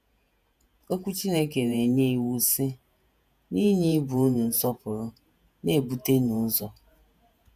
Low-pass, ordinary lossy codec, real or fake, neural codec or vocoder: 14.4 kHz; none; fake; vocoder, 48 kHz, 128 mel bands, Vocos